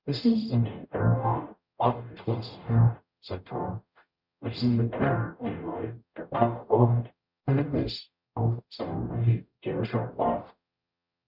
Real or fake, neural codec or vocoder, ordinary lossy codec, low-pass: fake; codec, 44.1 kHz, 0.9 kbps, DAC; Opus, 64 kbps; 5.4 kHz